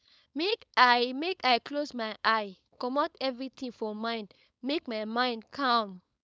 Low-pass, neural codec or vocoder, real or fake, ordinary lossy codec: none; codec, 16 kHz, 4.8 kbps, FACodec; fake; none